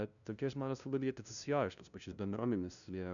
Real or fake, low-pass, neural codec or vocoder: fake; 7.2 kHz; codec, 16 kHz, 0.5 kbps, FunCodec, trained on LibriTTS, 25 frames a second